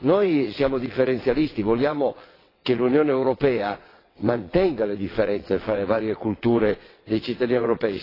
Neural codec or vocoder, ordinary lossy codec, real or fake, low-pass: vocoder, 22.05 kHz, 80 mel bands, WaveNeXt; AAC, 24 kbps; fake; 5.4 kHz